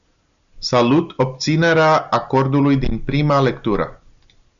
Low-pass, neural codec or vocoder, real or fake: 7.2 kHz; none; real